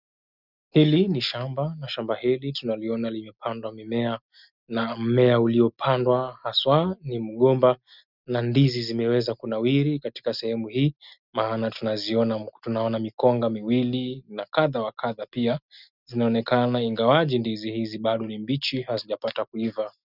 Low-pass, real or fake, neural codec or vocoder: 5.4 kHz; real; none